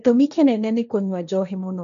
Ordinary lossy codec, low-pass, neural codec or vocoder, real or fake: none; 7.2 kHz; codec, 16 kHz, 1.1 kbps, Voila-Tokenizer; fake